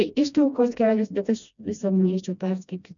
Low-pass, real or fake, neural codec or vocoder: 7.2 kHz; fake; codec, 16 kHz, 1 kbps, FreqCodec, smaller model